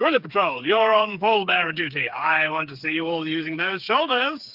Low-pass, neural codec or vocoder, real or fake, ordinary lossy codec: 5.4 kHz; codec, 16 kHz, 4 kbps, FreqCodec, smaller model; fake; Opus, 24 kbps